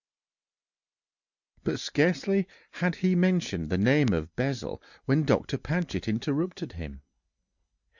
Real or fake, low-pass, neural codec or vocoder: real; 7.2 kHz; none